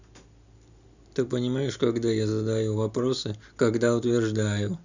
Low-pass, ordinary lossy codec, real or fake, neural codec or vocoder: 7.2 kHz; none; real; none